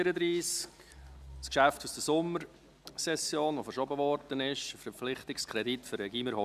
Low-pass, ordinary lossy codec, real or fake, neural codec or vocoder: 14.4 kHz; none; real; none